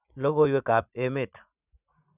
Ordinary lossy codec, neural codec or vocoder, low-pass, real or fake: none; vocoder, 44.1 kHz, 80 mel bands, Vocos; 3.6 kHz; fake